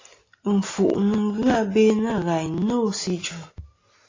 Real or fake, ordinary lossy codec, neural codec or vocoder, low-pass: real; AAC, 32 kbps; none; 7.2 kHz